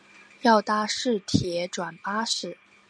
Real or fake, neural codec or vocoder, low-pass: real; none; 9.9 kHz